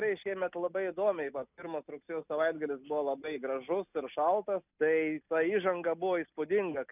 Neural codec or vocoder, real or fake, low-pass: none; real; 3.6 kHz